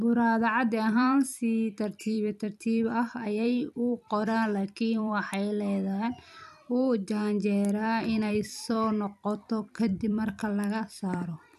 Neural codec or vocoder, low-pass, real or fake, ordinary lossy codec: vocoder, 44.1 kHz, 128 mel bands every 256 samples, BigVGAN v2; 14.4 kHz; fake; none